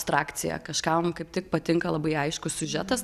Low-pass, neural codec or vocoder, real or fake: 14.4 kHz; none; real